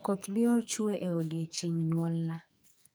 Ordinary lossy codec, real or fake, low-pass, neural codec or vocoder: none; fake; none; codec, 44.1 kHz, 2.6 kbps, SNAC